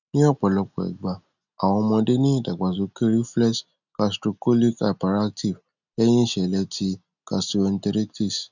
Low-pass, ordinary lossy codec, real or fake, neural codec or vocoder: 7.2 kHz; none; real; none